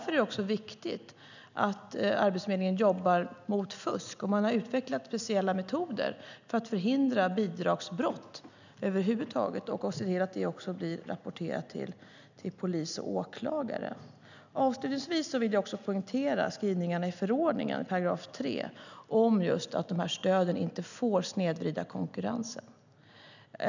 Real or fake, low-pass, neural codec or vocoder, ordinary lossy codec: real; 7.2 kHz; none; none